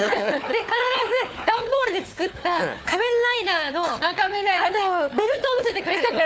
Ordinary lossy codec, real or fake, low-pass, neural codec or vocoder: none; fake; none; codec, 16 kHz, 4 kbps, FunCodec, trained on Chinese and English, 50 frames a second